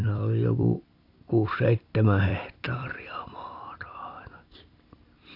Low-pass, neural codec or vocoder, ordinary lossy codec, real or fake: 5.4 kHz; vocoder, 44.1 kHz, 128 mel bands every 256 samples, BigVGAN v2; AAC, 32 kbps; fake